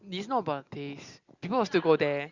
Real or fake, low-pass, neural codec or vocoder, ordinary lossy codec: real; 7.2 kHz; none; Opus, 64 kbps